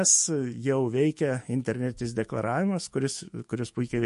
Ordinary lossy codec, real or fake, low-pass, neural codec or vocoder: MP3, 48 kbps; fake; 14.4 kHz; autoencoder, 48 kHz, 128 numbers a frame, DAC-VAE, trained on Japanese speech